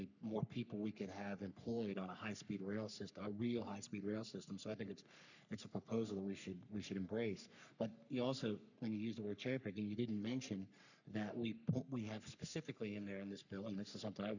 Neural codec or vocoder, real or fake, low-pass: codec, 44.1 kHz, 3.4 kbps, Pupu-Codec; fake; 7.2 kHz